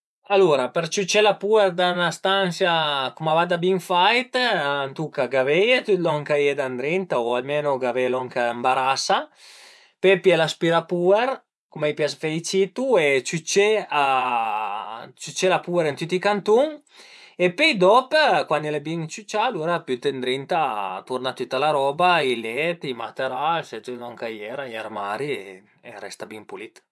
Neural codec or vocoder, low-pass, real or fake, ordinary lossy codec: vocoder, 24 kHz, 100 mel bands, Vocos; none; fake; none